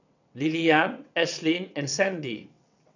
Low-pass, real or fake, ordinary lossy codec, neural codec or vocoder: 7.2 kHz; fake; none; vocoder, 22.05 kHz, 80 mel bands, WaveNeXt